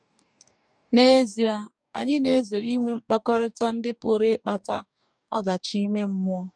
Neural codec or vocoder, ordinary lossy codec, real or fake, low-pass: codec, 44.1 kHz, 2.6 kbps, DAC; none; fake; 9.9 kHz